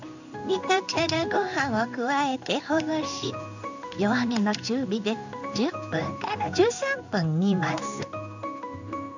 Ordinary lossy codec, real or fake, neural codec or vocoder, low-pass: none; fake; codec, 16 kHz in and 24 kHz out, 1 kbps, XY-Tokenizer; 7.2 kHz